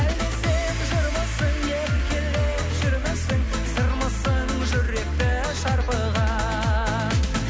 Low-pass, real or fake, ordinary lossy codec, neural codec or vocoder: none; real; none; none